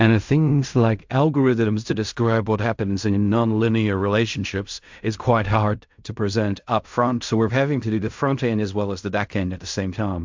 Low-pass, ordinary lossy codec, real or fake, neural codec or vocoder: 7.2 kHz; MP3, 64 kbps; fake; codec, 16 kHz in and 24 kHz out, 0.4 kbps, LongCat-Audio-Codec, fine tuned four codebook decoder